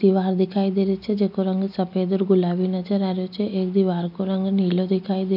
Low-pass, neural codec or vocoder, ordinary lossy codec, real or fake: 5.4 kHz; none; none; real